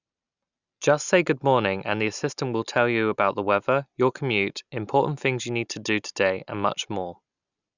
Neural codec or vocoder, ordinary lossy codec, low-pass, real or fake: none; none; 7.2 kHz; real